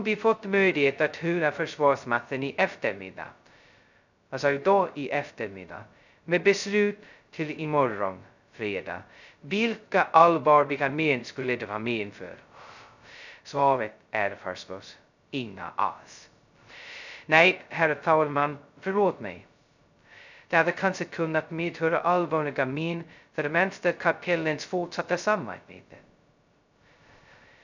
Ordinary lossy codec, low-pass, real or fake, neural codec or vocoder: none; 7.2 kHz; fake; codec, 16 kHz, 0.2 kbps, FocalCodec